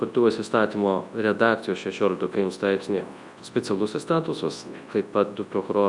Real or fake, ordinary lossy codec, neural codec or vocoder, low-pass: fake; Opus, 64 kbps; codec, 24 kHz, 0.9 kbps, WavTokenizer, large speech release; 10.8 kHz